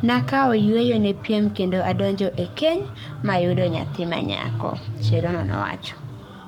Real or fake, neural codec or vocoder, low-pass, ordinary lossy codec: fake; codec, 44.1 kHz, 7.8 kbps, Pupu-Codec; 19.8 kHz; none